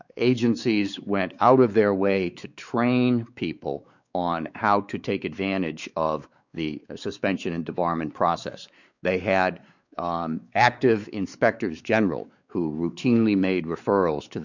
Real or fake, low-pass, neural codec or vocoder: fake; 7.2 kHz; codec, 16 kHz, 4 kbps, X-Codec, WavLM features, trained on Multilingual LibriSpeech